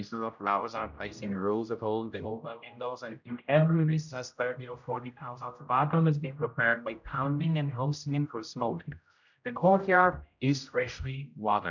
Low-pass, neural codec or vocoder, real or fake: 7.2 kHz; codec, 16 kHz, 0.5 kbps, X-Codec, HuBERT features, trained on general audio; fake